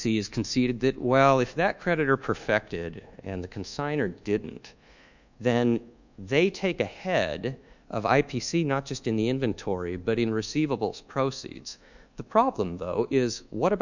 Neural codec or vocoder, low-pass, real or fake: codec, 24 kHz, 1.2 kbps, DualCodec; 7.2 kHz; fake